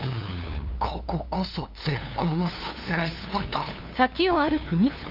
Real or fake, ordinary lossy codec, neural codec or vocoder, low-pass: fake; none; codec, 16 kHz, 2 kbps, FunCodec, trained on LibriTTS, 25 frames a second; 5.4 kHz